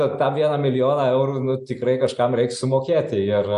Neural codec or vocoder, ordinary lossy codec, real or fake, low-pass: none; AAC, 96 kbps; real; 10.8 kHz